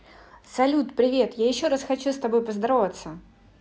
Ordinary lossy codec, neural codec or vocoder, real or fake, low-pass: none; none; real; none